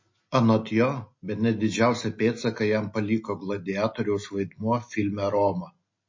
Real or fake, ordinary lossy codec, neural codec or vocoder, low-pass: real; MP3, 32 kbps; none; 7.2 kHz